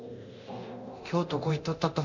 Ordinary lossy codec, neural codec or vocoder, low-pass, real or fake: none; codec, 24 kHz, 0.9 kbps, DualCodec; 7.2 kHz; fake